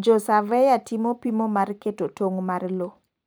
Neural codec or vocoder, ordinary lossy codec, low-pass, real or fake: vocoder, 44.1 kHz, 128 mel bands every 512 samples, BigVGAN v2; none; none; fake